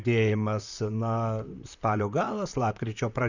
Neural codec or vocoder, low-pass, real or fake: vocoder, 44.1 kHz, 128 mel bands, Pupu-Vocoder; 7.2 kHz; fake